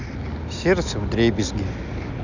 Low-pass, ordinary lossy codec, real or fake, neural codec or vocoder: 7.2 kHz; none; real; none